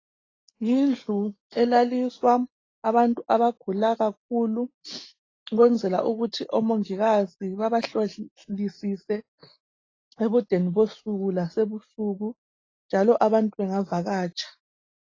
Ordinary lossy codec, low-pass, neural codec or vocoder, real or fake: AAC, 32 kbps; 7.2 kHz; none; real